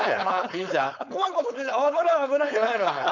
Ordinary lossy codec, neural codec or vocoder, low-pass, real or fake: none; codec, 16 kHz, 4.8 kbps, FACodec; 7.2 kHz; fake